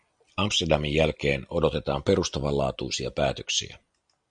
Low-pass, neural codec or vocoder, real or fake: 9.9 kHz; none; real